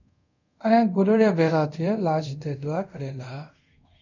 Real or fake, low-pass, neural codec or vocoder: fake; 7.2 kHz; codec, 24 kHz, 0.5 kbps, DualCodec